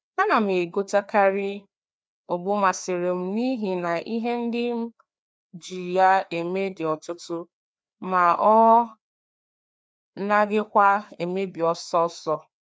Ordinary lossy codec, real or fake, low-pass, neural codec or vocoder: none; fake; none; codec, 16 kHz, 2 kbps, FreqCodec, larger model